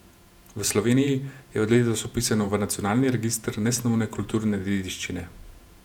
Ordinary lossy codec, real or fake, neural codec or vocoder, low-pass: none; fake; vocoder, 48 kHz, 128 mel bands, Vocos; 19.8 kHz